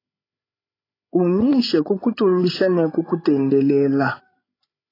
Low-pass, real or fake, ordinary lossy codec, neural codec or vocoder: 5.4 kHz; fake; AAC, 24 kbps; codec, 16 kHz, 16 kbps, FreqCodec, larger model